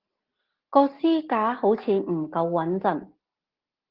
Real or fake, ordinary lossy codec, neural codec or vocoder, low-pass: real; Opus, 16 kbps; none; 5.4 kHz